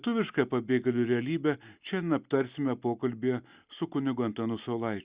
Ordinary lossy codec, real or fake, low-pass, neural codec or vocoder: Opus, 64 kbps; real; 3.6 kHz; none